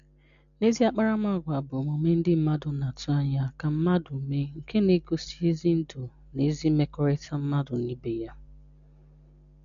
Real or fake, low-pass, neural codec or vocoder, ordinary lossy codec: real; 7.2 kHz; none; none